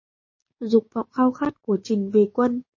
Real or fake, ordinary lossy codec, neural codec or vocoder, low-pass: fake; MP3, 32 kbps; codec, 16 kHz, 6 kbps, DAC; 7.2 kHz